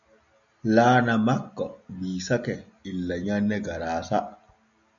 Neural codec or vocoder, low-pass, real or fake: none; 7.2 kHz; real